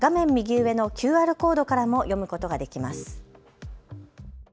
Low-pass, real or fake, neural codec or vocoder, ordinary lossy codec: none; real; none; none